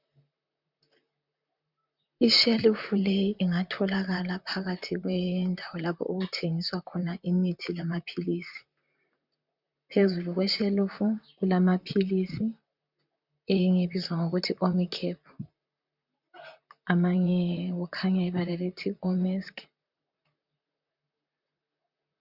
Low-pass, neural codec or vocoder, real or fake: 5.4 kHz; vocoder, 44.1 kHz, 128 mel bands, Pupu-Vocoder; fake